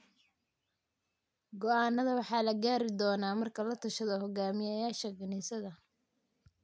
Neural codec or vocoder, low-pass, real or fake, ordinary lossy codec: none; none; real; none